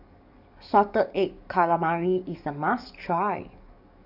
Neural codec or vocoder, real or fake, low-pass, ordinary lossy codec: codec, 44.1 kHz, 7.8 kbps, DAC; fake; 5.4 kHz; none